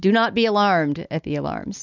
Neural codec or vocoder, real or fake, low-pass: none; real; 7.2 kHz